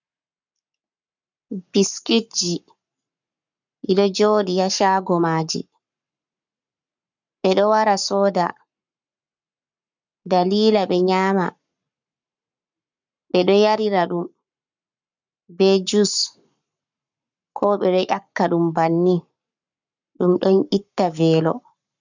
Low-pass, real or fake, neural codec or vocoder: 7.2 kHz; fake; codec, 44.1 kHz, 7.8 kbps, Pupu-Codec